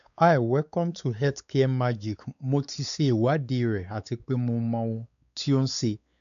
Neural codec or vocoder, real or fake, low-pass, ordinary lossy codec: codec, 16 kHz, 4 kbps, X-Codec, WavLM features, trained on Multilingual LibriSpeech; fake; 7.2 kHz; none